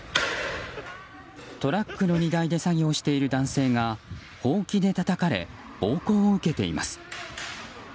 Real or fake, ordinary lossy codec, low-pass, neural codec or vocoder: real; none; none; none